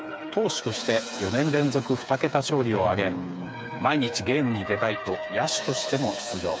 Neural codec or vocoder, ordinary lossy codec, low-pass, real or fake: codec, 16 kHz, 4 kbps, FreqCodec, smaller model; none; none; fake